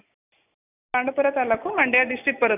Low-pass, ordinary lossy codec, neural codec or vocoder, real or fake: 3.6 kHz; none; none; real